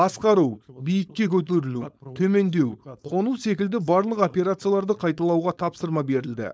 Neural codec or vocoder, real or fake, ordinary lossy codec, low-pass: codec, 16 kHz, 4.8 kbps, FACodec; fake; none; none